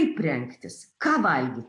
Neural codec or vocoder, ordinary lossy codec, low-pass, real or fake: none; AAC, 48 kbps; 10.8 kHz; real